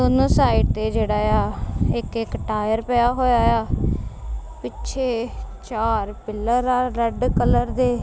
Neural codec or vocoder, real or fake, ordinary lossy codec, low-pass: none; real; none; none